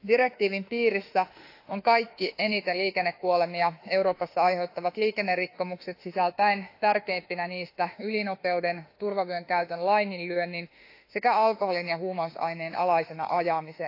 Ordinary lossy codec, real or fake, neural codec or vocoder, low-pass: AAC, 32 kbps; fake; autoencoder, 48 kHz, 32 numbers a frame, DAC-VAE, trained on Japanese speech; 5.4 kHz